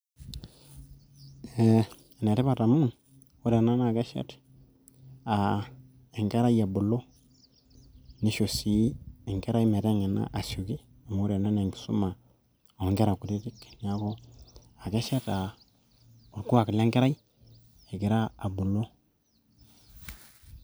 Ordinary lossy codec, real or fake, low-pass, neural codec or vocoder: none; real; none; none